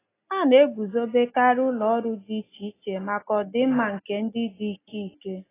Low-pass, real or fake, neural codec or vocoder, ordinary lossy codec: 3.6 kHz; real; none; AAC, 16 kbps